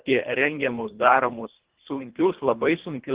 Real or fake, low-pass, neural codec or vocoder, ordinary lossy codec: fake; 3.6 kHz; codec, 24 kHz, 1.5 kbps, HILCodec; Opus, 16 kbps